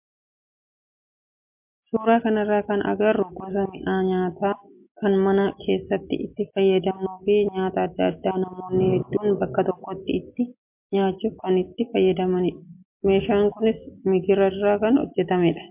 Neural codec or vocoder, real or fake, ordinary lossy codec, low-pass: none; real; MP3, 32 kbps; 3.6 kHz